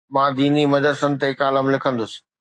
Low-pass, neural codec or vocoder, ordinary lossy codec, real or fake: 10.8 kHz; codec, 44.1 kHz, 7.8 kbps, DAC; AAC, 64 kbps; fake